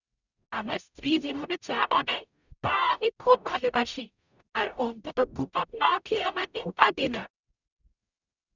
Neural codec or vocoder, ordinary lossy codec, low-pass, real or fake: codec, 44.1 kHz, 0.9 kbps, DAC; none; 7.2 kHz; fake